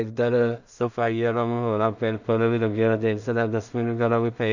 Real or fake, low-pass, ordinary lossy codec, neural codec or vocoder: fake; 7.2 kHz; none; codec, 16 kHz in and 24 kHz out, 0.4 kbps, LongCat-Audio-Codec, two codebook decoder